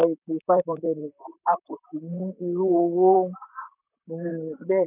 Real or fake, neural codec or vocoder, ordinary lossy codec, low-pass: fake; vocoder, 44.1 kHz, 128 mel bands, Pupu-Vocoder; none; 3.6 kHz